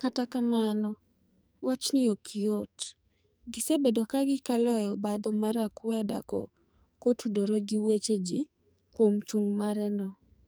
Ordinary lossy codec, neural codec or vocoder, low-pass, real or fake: none; codec, 44.1 kHz, 2.6 kbps, SNAC; none; fake